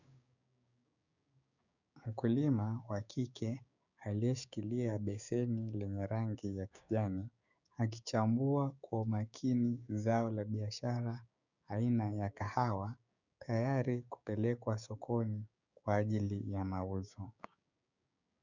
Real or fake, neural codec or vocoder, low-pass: fake; codec, 16 kHz, 6 kbps, DAC; 7.2 kHz